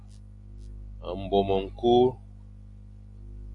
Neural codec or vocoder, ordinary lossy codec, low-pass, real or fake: none; AAC, 48 kbps; 10.8 kHz; real